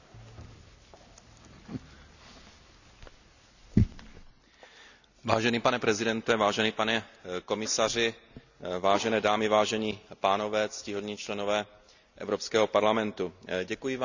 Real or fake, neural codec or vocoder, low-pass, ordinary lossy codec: real; none; 7.2 kHz; none